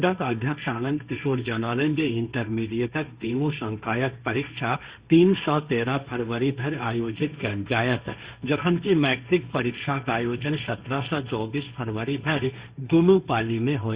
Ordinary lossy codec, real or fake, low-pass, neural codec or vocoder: Opus, 64 kbps; fake; 3.6 kHz; codec, 16 kHz, 1.1 kbps, Voila-Tokenizer